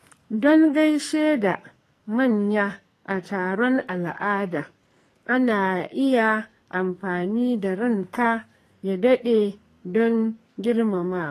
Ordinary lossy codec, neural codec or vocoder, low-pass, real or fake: AAC, 48 kbps; codec, 44.1 kHz, 2.6 kbps, SNAC; 14.4 kHz; fake